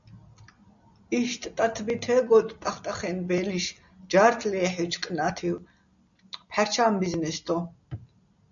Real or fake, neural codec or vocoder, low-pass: real; none; 7.2 kHz